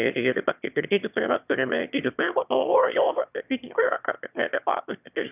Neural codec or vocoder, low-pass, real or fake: autoencoder, 22.05 kHz, a latent of 192 numbers a frame, VITS, trained on one speaker; 3.6 kHz; fake